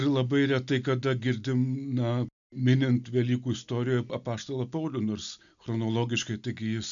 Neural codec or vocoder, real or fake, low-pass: none; real; 7.2 kHz